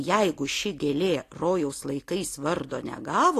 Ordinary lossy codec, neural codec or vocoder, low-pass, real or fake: AAC, 48 kbps; none; 14.4 kHz; real